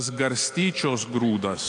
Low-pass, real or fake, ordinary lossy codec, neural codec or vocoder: 9.9 kHz; real; AAC, 48 kbps; none